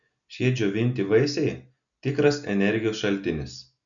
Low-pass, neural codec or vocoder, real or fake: 7.2 kHz; none; real